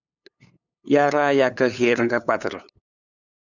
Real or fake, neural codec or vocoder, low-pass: fake; codec, 16 kHz, 8 kbps, FunCodec, trained on LibriTTS, 25 frames a second; 7.2 kHz